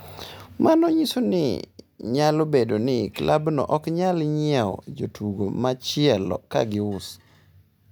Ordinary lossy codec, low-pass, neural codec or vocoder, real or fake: none; none; none; real